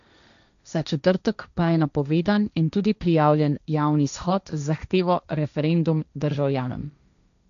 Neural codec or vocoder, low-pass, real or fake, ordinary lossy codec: codec, 16 kHz, 1.1 kbps, Voila-Tokenizer; 7.2 kHz; fake; none